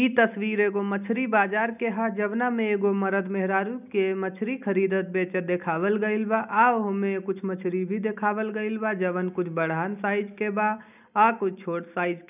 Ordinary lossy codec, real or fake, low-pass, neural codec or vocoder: none; real; 3.6 kHz; none